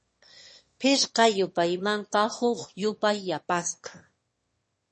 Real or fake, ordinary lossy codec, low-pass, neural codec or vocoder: fake; MP3, 32 kbps; 9.9 kHz; autoencoder, 22.05 kHz, a latent of 192 numbers a frame, VITS, trained on one speaker